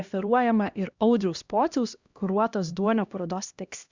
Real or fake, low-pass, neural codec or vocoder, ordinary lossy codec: fake; 7.2 kHz; codec, 16 kHz, 1 kbps, X-Codec, HuBERT features, trained on LibriSpeech; Opus, 64 kbps